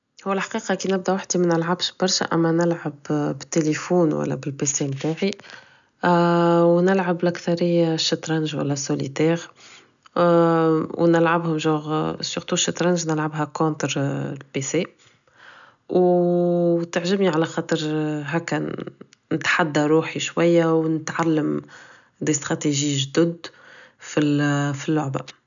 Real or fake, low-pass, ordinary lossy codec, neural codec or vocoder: real; 7.2 kHz; none; none